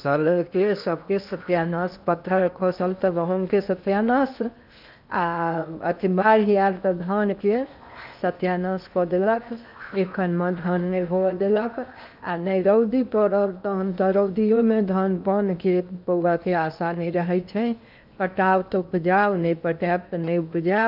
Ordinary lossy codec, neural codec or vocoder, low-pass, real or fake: none; codec, 16 kHz in and 24 kHz out, 0.8 kbps, FocalCodec, streaming, 65536 codes; 5.4 kHz; fake